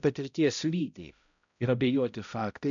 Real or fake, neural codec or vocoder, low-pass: fake; codec, 16 kHz, 0.5 kbps, X-Codec, HuBERT features, trained on balanced general audio; 7.2 kHz